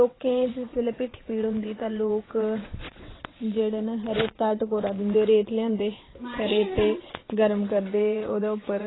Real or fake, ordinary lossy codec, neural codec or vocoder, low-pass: fake; AAC, 16 kbps; vocoder, 22.05 kHz, 80 mel bands, WaveNeXt; 7.2 kHz